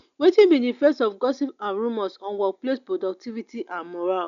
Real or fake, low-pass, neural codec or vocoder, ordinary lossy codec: real; 7.2 kHz; none; none